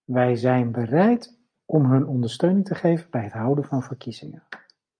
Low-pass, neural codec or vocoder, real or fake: 9.9 kHz; none; real